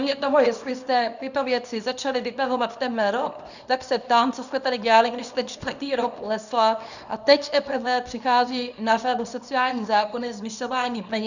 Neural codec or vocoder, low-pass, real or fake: codec, 24 kHz, 0.9 kbps, WavTokenizer, small release; 7.2 kHz; fake